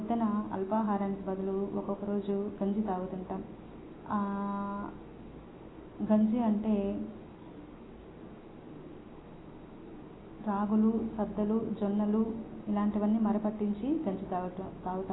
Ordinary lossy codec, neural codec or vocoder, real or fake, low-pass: AAC, 16 kbps; none; real; 7.2 kHz